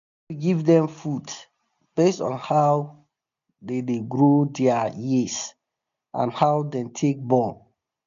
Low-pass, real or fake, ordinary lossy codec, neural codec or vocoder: 7.2 kHz; real; none; none